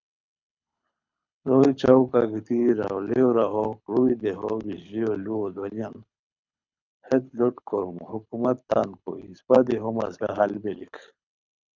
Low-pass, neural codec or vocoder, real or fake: 7.2 kHz; codec, 24 kHz, 6 kbps, HILCodec; fake